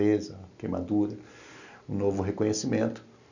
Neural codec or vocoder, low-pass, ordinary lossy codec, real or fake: none; 7.2 kHz; none; real